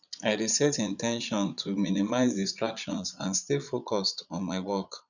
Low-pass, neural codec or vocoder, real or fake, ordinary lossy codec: 7.2 kHz; vocoder, 22.05 kHz, 80 mel bands, Vocos; fake; none